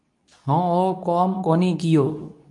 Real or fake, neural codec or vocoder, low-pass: fake; codec, 24 kHz, 0.9 kbps, WavTokenizer, medium speech release version 2; 10.8 kHz